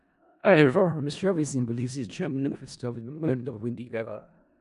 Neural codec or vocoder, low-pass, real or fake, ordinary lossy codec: codec, 16 kHz in and 24 kHz out, 0.4 kbps, LongCat-Audio-Codec, four codebook decoder; 10.8 kHz; fake; none